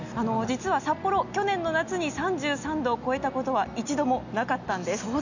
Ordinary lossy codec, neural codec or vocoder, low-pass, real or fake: none; none; 7.2 kHz; real